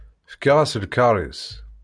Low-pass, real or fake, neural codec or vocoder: 9.9 kHz; real; none